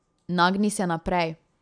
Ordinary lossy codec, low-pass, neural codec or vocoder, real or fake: none; 9.9 kHz; none; real